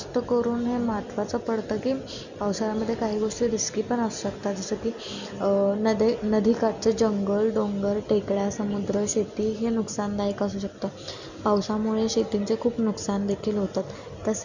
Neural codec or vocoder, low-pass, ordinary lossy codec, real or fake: none; 7.2 kHz; none; real